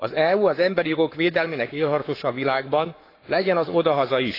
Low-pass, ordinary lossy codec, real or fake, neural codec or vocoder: 5.4 kHz; AAC, 32 kbps; fake; codec, 44.1 kHz, 7.8 kbps, Pupu-Codec